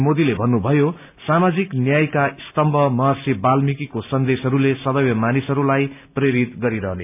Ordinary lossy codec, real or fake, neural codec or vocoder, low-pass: AAC, 32 kbps; real; none; 3.6 kHz